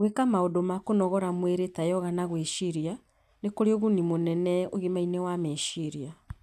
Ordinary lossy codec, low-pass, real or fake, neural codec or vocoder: none; 14.4 kHz; real; none